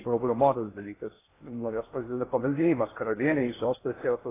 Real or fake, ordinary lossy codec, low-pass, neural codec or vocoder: fake; AAC, 16 kbps; 3.6 kHz; codec, 16 kHz in and 24 kHz out, 0.6 kbps, FocalCodec, streaming, 2048 codes